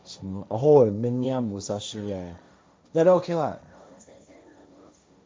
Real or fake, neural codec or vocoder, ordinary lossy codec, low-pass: fake; codec, 16 kHz, 1.1 kbps, Voila-Tokenizer; none; none